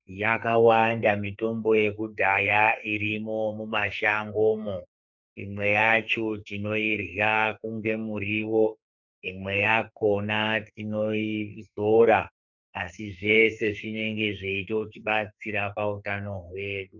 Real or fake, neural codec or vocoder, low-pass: fake; codec, 44.1 kHz, 2.6 kbps, SNAC; 7.2 kHz